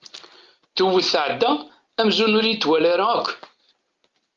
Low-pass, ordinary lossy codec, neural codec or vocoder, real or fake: 7.2 kHz; Opus, 32 kbps; none; real